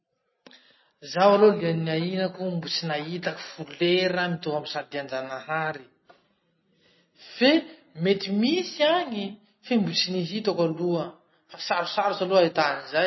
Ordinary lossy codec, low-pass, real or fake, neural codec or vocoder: MP3, 24 kbps; 7.2 kHz; real; none